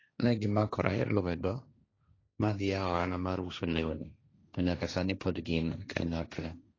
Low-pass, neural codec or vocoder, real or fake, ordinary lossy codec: 7.2 kHz; codec, 16 kHz, 1.1 kbps, Voila-Tokenizer; fake; AAC, 32 kbps